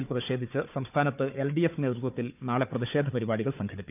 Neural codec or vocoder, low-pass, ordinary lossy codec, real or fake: codec, 16 kHz, 4 kbps, FunCodec, trained on Chinese and English, 50 frames a second; 3.6 kHz; none; fake